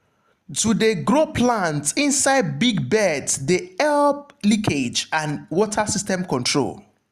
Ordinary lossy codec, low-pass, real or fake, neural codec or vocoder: Opus, 64 kbps; 14.4 kHz; real; none